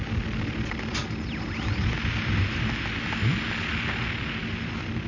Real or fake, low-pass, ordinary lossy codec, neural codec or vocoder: real; 7.2 kHz; none; none